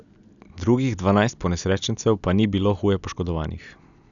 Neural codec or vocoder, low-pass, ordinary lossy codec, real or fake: none; 7.2 kHz; none; real